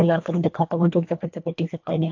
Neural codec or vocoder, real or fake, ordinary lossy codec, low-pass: codec, 24 kHz, 1.5 kbps, HILCodec; fake; AAC, 48 kbps; 7.2 kHz